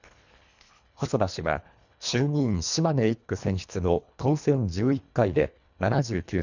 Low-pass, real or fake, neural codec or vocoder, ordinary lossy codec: 7.2 kHz; fake; codec, 24 kHz, 1.5 kbps, HILCodec; none